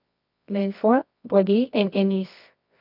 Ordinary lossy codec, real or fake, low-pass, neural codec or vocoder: none; fake; 5.4 kHz; codec, 24 kHz, 0.9 kbps, WavTokenizer, medium music audio release